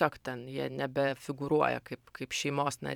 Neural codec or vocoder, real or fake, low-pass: vocoder, 44.1 kHz, 128 mel bands every 512 samples, BigVGAN v2; fake; 19.8 kHz